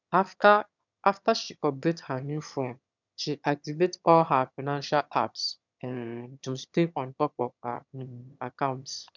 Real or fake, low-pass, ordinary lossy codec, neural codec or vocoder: fake; 7.2 kHz; none; autoencoder, 22.05 kHz, a latent of 192 numbers a frame, VITS, trained on one speaker